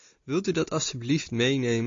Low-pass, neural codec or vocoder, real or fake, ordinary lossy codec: 7.2 kHz; none; real; AAC, 48 kbps